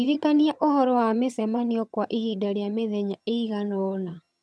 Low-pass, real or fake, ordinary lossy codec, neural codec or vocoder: none; fake; none; vocoder, 22.05 kHz, 80 mel bands, HiFi-GAN